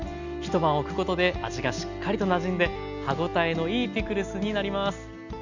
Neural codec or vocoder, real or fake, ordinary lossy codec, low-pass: none; real; none; 7.2 kHz